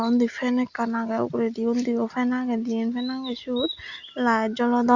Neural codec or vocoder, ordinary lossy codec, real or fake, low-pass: none; Opus, 64 kbps; real; 7.2 kHz